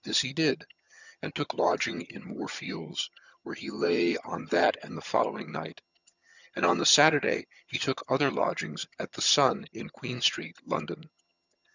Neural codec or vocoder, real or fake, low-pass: vocoder, 22.05 kHz, 80 mel bands, HiFi-GAN; fake; 7.2 kHz